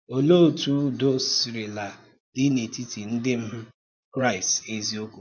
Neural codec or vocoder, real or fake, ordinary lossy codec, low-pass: vocoder, 24 kHz, 100 mel bands, Vocos; fake; none; 7.2 kHz